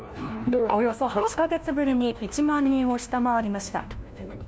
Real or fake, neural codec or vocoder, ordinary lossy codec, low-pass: fake; codec, 16 kHz, 1 kbps, FunCodec, trained on LibriTTS, 50 frames a second; none; none